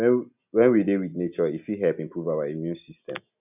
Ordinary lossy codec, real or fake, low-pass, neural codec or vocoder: none; real; 3.6 kHz; none